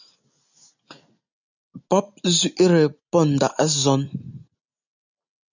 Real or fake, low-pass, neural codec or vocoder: real; 7.2 kHz; none